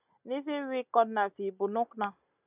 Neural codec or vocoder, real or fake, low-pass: none; real; 3.6 kHz